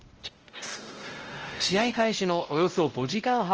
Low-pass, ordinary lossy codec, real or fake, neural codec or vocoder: 7.2 kHz; Opus, 16 kbps; fake; codec, 16 kHz, 0.5 kbps, X-Codec, WavLM features, trained on Multilingual LibriSpeech